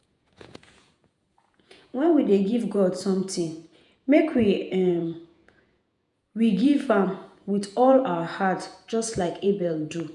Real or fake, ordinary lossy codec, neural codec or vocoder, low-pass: fake; none; vocoder, 48 kHz, 128 mel bands, Vocos; 10.8 kHz